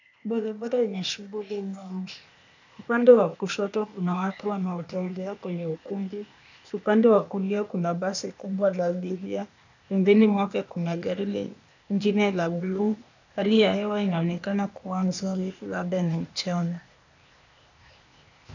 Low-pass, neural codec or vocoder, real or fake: 7.2 kHz; codec, 16 kHz, 0.8 kbps, ZipCodec; fake